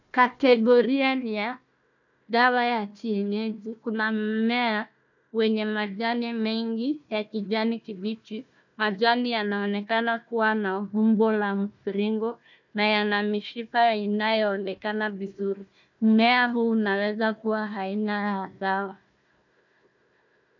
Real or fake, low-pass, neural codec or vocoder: fake; 7.2 kHz; codec, 16 kHz, 1 kbps, FunCodec, trained on Chinese and English, 50 frames a second